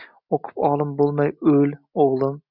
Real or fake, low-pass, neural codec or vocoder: real; 5.4 kHz; none